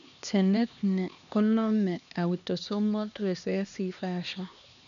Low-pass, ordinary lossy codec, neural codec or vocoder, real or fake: 7.2 kHz; none; codec, 16 kHz, 2 kbps, X-Codec, HuBERT features, trained on LibriSpeech; fake